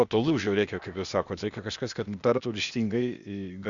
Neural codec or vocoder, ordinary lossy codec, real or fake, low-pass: codec, 16 kHz, 0.8 kbps, ZipCodec; Opus, 64 kbps; fake; 7.2 kHz